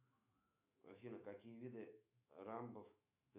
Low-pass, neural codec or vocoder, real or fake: 3.6 kHz; autoencoder, 48 kHz, 128 numbers a frame, DAC-VAE, trained on Japanese speech; fake